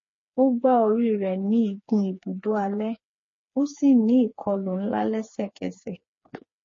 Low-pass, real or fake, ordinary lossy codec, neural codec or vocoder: 7.2 kHz; fake; MP3, 32 kbps; codec, 16 kHz, 4 kbps, FreqCodec, smaller model